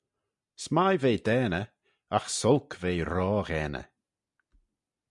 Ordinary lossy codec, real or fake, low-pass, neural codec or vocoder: MP3, 96 kbps; real; 10.8 kHz; none